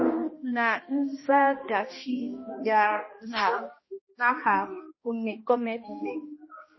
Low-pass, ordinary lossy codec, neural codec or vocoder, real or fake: 7.2 kHz; MP3, 24 kbps; codec, 16 kHz, 1 kbps, X-Codec, HuBERT features, trained on balanced general audio; fake